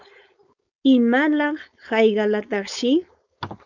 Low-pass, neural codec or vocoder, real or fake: 7.2 kHz; codec, 16 kHz, 4.8 kbps, FACodec; fake